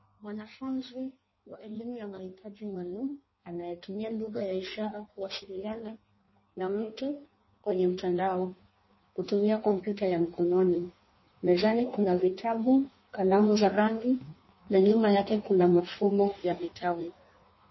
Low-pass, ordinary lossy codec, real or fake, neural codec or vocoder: 7.2 kHz; MP3, 24 kbps; fake; codec, 16 kHz in and 24 kHz out, 1.1 kbps, FireRedTTS-2 codec